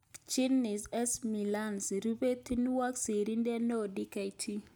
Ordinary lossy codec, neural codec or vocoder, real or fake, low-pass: none; none; real; none